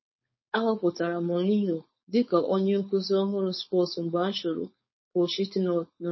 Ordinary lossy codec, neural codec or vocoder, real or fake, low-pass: MP3, 24 kbps; codec, 16 kHz, 4.8 kbps, FACodec; fake; 7.2 kHz